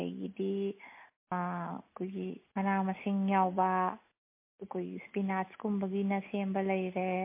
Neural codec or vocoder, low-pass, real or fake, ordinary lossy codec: none; 3.6 kHz; real; MP3, 24 kbps